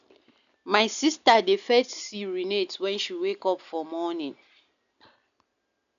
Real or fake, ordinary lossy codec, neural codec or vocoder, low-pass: real; none; none; 7.2 kHz